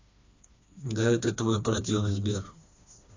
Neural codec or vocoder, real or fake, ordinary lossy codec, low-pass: codec, 16 kHz, 2 kbps, FreqCodec, smaller model; fake; AAC, 48 kbps; 7.2 kHz